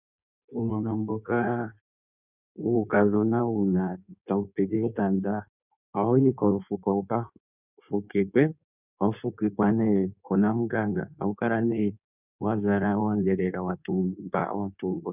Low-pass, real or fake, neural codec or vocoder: 3.6 kHz; fake; codec, 16 kHz in and 24 kHz out, 1.1 kbps, FireRedTTS-2 codec